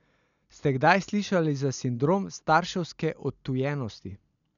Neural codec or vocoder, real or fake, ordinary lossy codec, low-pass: none; real; none; 7.2 kHz